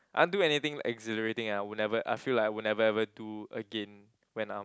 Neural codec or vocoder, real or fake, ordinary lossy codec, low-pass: none; real; none; none